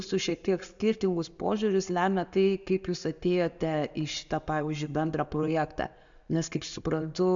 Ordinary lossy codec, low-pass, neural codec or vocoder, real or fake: AAC, 64 kbps; 7.2 kHz; none; real